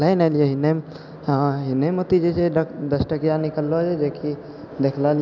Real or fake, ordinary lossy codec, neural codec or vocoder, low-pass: real; none; none; 7.2 kHz